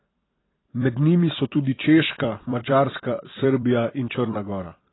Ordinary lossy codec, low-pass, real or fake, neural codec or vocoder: AAC, 16 kbps; 7.2 kHz; fake; vocoder, 44.1 kHz, 128 mel bands every 256 samples, BigVGAN v2